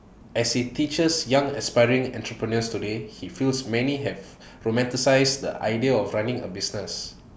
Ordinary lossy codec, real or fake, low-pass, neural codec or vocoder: none; real; none; none